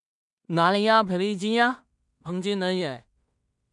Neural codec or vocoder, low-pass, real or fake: codec, 16 kHz in and 24 kHz out, 0.4 kbps, LongCat-Audio-Codec, two codebook decoder; 10.8 kHz; fake